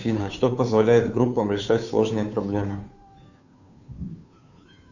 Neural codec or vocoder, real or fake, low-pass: codec, 16 kHz, 2 kbps, FunCodec, trained on Chinese and English, 25 frames a second; fake; 7.2 kHz